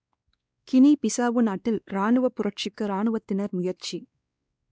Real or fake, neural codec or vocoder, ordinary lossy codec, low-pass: fake; codec, 16 kHz, 2 kbps, X-Codec, WavLM features, trained on Multilingual LibriSpeech; none; none